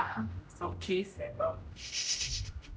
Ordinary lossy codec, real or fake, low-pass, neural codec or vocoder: none; fake; none; codec, 16 kHz, 0.5 kbps, X-Codec, HuBERT features, trained on general audio